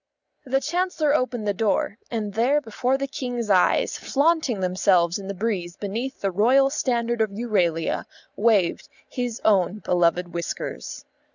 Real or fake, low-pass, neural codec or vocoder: real; 7.2 kHz; none